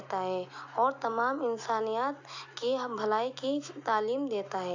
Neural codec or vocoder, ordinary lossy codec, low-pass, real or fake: none; AAC, 48 kbps; 7.2 kHz; real